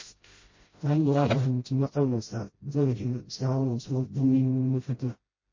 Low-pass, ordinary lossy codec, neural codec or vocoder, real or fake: 7.2 kHz; MP3, 32 kbps; codec, 16 kHz, 0.5 kbps, FreqCodec, smaller model; fake